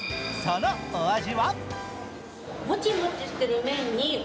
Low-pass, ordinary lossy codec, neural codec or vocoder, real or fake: none; none; none; real